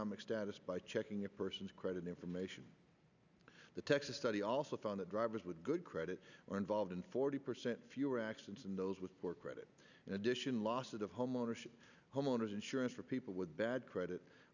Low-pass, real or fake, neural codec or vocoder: 7.2 kHz; real; none